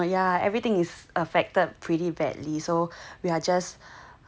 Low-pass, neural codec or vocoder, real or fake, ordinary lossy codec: none; none; real; none